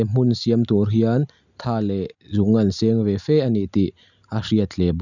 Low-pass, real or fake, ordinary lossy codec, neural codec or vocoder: 7.2 kHz; real; none; none